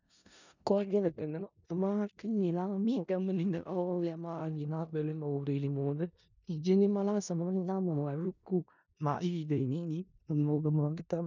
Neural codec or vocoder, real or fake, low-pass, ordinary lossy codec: codec, 16 kHz in and 24 kHz out, 0.4 kbps, LongCat-Audio-Codec, four codebook decoder; fake; 7.2 kHz; none